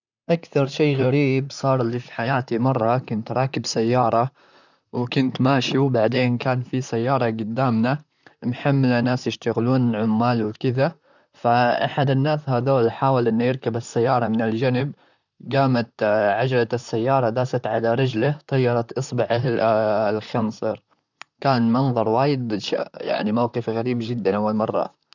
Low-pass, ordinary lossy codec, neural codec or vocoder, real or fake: 7.2 kHz; none; vocoder, 44.1 kHz, 128 mel bands, Pupu-Vocoder; fake